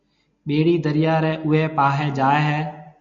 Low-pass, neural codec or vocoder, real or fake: 7.2 kHz; none; real